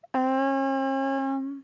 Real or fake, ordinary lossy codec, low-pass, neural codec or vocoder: real; none; 7.2 kHz; none